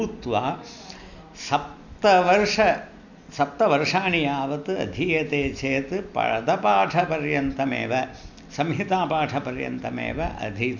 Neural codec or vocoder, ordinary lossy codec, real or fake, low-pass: none; none; real; 7.2 kHz